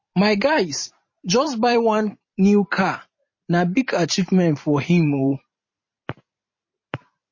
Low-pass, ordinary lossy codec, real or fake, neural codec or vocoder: 7.2 kHz; MP3, 32 kbps; fake; vocoder, 44.1 kHz, 128 mel bands every 512 samples, BigVGAN v2